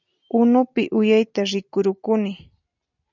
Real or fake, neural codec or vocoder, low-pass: real; none; 7.2 kHz